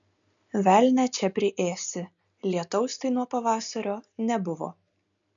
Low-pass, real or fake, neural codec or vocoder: 7.2 kHz; real; none